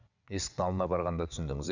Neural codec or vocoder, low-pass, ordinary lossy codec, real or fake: vocoder, 22.05 kHz, 80 mel bands, WaveNeXt; 7.2 kHz; none; fake